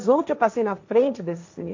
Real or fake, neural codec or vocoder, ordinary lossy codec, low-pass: fake; codec, 16 kHz, 1.1 kbps, Voila-Tokenizer; MP3, 48 kbps; 7.2 kHz